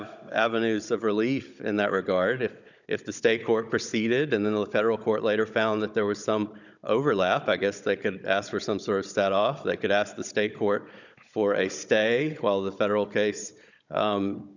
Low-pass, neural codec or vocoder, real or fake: 7.2 kHz; codec, 16 kHz, 16 kbps, FunCodec, trained on Chinese and English, 50 frames a second; fake